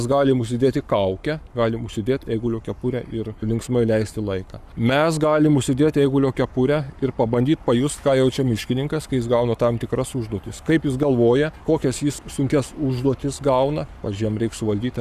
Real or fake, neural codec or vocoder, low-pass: fake; codec, 44.1 kHz, 7.8 kbps, Pupu-Codec; 14.4 kHz